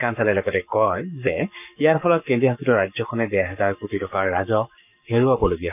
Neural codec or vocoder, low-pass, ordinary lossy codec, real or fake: codec, 44.1 kHz, 7.8 kbps, Pupu-Codec; 3.6 kHz; none; fake